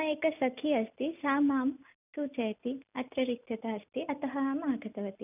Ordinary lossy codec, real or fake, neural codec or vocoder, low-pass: none; real; none; 3.6 kHz